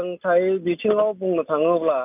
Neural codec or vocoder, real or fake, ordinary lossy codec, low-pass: none; real; none; 3.6 kHz